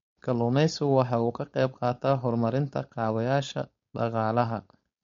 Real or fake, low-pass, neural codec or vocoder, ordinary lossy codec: fake; 7.2 kHz; codec, 16 kHz, 4.8 kbps, FACodec; MP3, 48 kbps